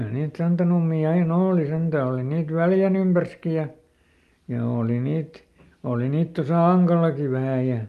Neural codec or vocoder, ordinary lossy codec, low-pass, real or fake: none; Opus, 32 kbps; 14.4 kHz; real